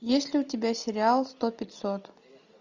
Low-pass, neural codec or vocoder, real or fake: 7.2 kHz; none; real